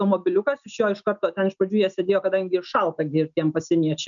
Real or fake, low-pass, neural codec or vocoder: real; 7.2 kHz; none